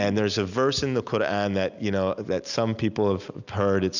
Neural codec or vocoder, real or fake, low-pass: none; real; 7.2 kHz